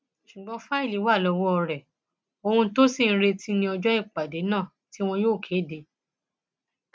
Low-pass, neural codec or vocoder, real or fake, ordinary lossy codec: none; none; real; none